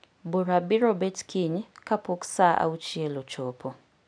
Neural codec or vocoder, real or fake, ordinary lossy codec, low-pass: none; real; none; 9.9 kHz